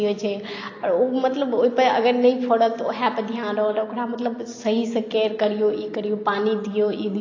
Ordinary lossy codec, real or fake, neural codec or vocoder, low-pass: AAC, 32 kbps; real; none; 7.2 kHz